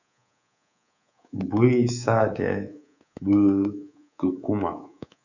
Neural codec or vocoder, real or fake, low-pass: codec, 24 kHz, 3.1 kbps, DualCodec; fake; 7.2 kHz